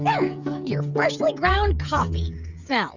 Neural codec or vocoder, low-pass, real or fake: codec, 16 kHz, 8 kbps, FreqCodec, smaller model; 7.2 kHz; fake